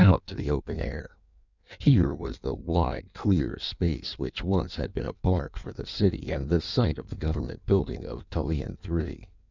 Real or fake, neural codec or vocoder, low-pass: fake; codec, 16 kHz in and 24 kHz out, 1.1 kbps, FireRedTTS-2 codec; 7.2 kHz